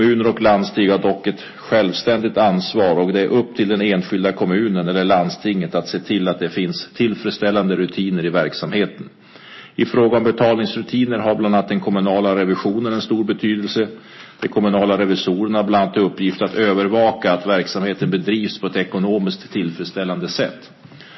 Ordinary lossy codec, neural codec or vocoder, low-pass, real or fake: MP3, 24 kbps; none; 7.2 kHz; real